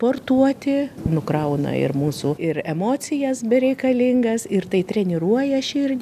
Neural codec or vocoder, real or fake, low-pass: none; real; 14.4 kHz